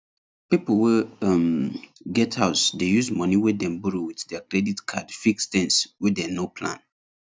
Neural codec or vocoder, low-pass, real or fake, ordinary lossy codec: none; none; real; none